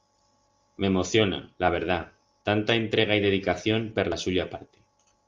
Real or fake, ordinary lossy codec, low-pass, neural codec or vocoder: real; Opus, 32 kbps; 7.2 kHz; none